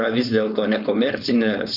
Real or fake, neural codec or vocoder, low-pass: fake; codec, 16 kHz, 4.8 kbps, FACodec; 5.4 kHz